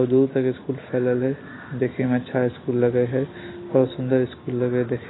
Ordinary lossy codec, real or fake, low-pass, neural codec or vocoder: AAC, 16 kbps; real; 7.2 kHz; none